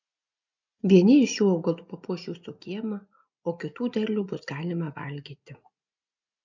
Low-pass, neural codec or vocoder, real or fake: 7.2 kHz; none; real